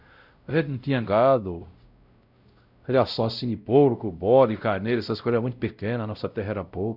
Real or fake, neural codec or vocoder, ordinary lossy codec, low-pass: fake; codec, 16 kHz, 0.5 kbps, X-Codec, WavLM features, trained on Multilingual LibriSpeech; none; 5.4 kHz